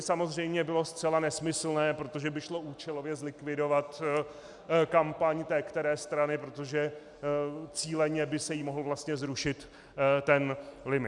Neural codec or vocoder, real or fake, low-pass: none; real; 10.8 kHz